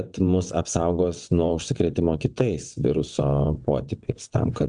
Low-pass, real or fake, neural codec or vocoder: 9.9 kHz; fake; vocoder, 22.05 kHz, 80 mel bands, WaveNeXt